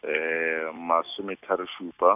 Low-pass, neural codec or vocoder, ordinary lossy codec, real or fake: 3.6 kHz; none; none; real